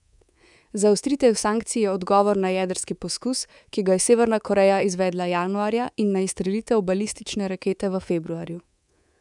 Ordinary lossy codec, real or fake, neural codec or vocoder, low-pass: none; fake; codec, 24 kHz, 3.1 kbps, DualCodec; 10.8 kHz